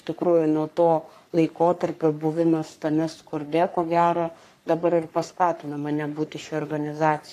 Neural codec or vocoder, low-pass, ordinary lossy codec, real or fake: codec, 44.1 kHz, 3.4 kbps, Pupu-Codec; 14.4 kHz; AAC, 64 kbps; fake